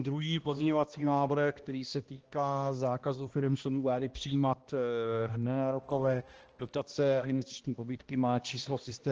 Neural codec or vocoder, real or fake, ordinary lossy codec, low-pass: codec, 16 kHz, 1 kbps, X-Codec, HuBERT features, trained on balanced general audio; fake; Opus, 16 kbps; 7.2 kHz